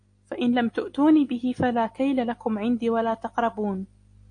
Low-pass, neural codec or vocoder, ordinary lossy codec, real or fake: 9.9 kHz; none; AAC, 48 kbps; real